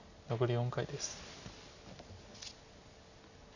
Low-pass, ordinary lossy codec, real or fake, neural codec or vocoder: 7.2 kHz; none; real; none